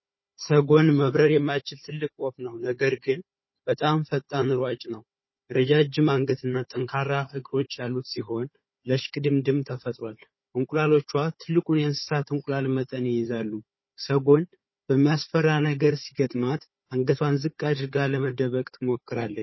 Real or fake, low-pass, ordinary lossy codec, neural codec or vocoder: fake; 7.2 kHz; MP3, 24 kbps; codec, 16 kHz, 4 kbps, FunCodec, trained on Chinese and English, 50 frames a second